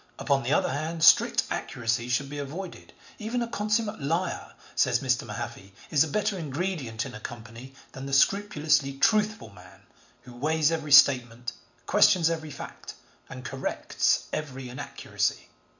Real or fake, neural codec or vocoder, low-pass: real; none; 7.2 kHz